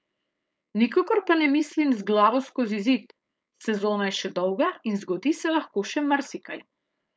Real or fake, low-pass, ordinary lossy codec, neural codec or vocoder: fake; none; none; codec, 16 kHz, 4.8 kbps, FACodec